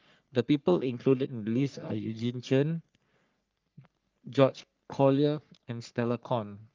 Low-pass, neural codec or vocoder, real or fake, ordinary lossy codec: 7.2 kHz; codec, 44.1 kHz, 3.4 kbps, Pupu-Codec; fake; Opus, 24 kbps